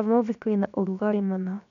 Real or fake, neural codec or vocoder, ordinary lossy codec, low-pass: fake; codec, 16 kHz, 0.7 kbps, FocalCodec; none; 7.2 kHz